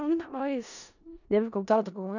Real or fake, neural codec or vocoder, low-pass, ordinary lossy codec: fake; codec, 16 kHz in and 24 kHz out, 0.4 kbps, LongCat-Audio-Codec, four codebook decoder; 7.2 kHz; none